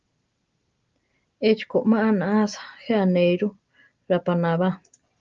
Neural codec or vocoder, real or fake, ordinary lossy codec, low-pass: none; real; Opus, 32 kbps; 7.2 kHz